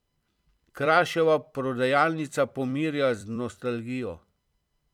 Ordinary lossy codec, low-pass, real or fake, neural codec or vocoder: none; 19.8 kHz; fake; vocoder, 44.1 kHz, 128 mel bands every 256 samples, BigVGAN v2